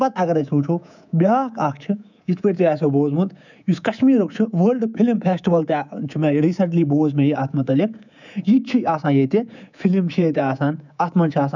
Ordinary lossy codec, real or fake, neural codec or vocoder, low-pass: none; fake; codec, 24 kHz, 3.1 kbps, DualCodec; 7.2 kHz